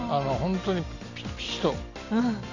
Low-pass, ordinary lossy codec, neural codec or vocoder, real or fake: 7.2 kHz; none; none; real